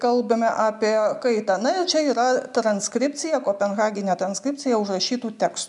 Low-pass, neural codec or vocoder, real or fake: 10.8 kHz; none; real